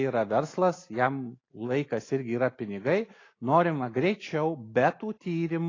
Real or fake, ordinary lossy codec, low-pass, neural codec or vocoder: real; AAC, 32 kbps; 7.2 kHz; none